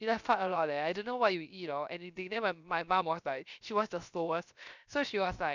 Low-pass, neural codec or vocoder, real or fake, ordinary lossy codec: 7.2 kHz; codec, 16 kHz, 0.7 kbps, FocalCodec; fake; none